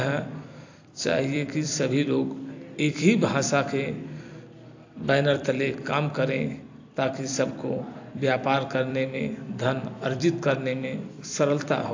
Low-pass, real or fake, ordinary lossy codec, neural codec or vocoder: 7.2 kHz; fake; AAC, 48 kbps; vocoder, 44.1 kHz, 128 mel bands every 256 samples, BigVGAN v2